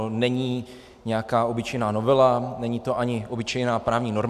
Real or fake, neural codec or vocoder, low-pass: real; none; 14.4 kHz